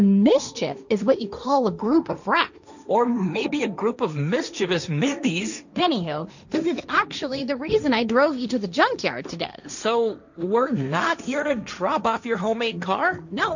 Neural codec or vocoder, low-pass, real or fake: codec, 16 kHz, 1.1 kbps, Voila-Tokenizer; 7.2 kHz; fake